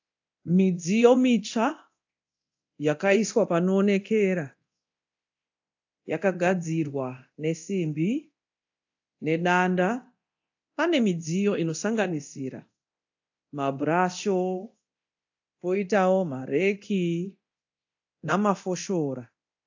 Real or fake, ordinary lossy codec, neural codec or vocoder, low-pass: fake; AAC, 48 kbps; codec, 24 kHz, 0.9 kbps, DualCodec; 7.2 kHz